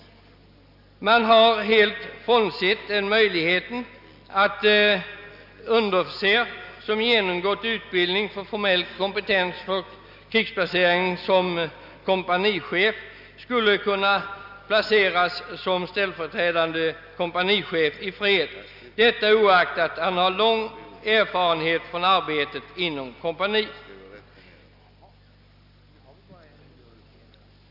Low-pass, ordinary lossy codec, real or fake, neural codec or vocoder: 5.4 kHz; none; real; none